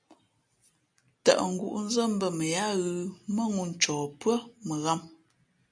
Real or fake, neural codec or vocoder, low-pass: real; none; 9.9 kHz